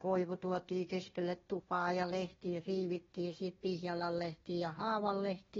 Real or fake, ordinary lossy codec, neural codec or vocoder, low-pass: fake; AAC, 24 kbps; codec, 16 kHz, 1 kbps, FunCodec, trained on Chinese and English, 50 frames a second; 7.2 kHz